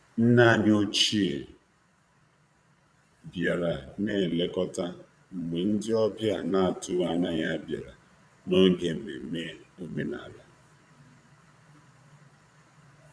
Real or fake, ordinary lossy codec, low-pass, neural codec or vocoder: fake; none; none; vocoder, 22.05 kHz, 80 mel bands, Vocos